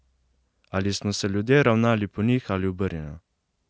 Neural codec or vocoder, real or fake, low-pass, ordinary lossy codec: none; real; none; none